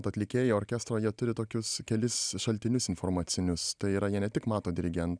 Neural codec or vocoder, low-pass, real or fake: none; 9.9 kHz; real